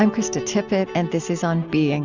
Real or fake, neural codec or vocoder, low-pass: real; none; 7.2 kHz